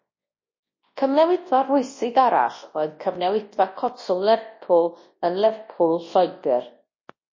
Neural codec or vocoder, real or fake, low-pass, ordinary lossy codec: codec, 24 kHz, 0.9 kbps, WavTokenizer, large speech release; fake; 7.2 kHz; MP3, 32 kbps